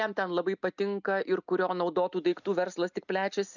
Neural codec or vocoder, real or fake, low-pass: none; real; 7.2 kHz